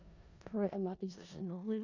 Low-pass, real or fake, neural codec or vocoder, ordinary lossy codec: 7.2 kHz; fake; codec, 16 kHz in and 24 kHz out, 0.4 kbps, LongCat-Audio-Codec, four codebook decoder; none